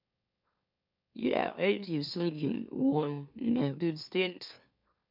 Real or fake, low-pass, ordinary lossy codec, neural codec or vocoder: fake; 5.4 kHz; MP3, 48 kbps; autoencoder, 44.1 kHz, a latent of 192 numbers a frame, MeloTTS